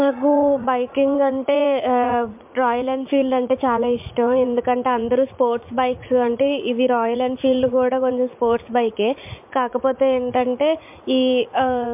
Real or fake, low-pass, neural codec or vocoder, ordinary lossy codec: fake; 3.6 kHz; vocoder, 44.1 kHz, 80 mel bands, Vocos; MP3, 32 kbps